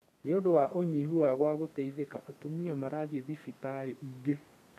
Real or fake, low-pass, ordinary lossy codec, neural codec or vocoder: fake; 14.4 kHz; none; codec, 32 kHz, 1.9 kbps, SNAC